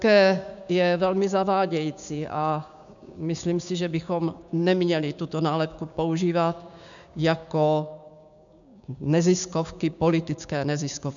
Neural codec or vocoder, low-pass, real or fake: codec, 16 kHz, 6 kbps, DAC; 7.2 kHz; fake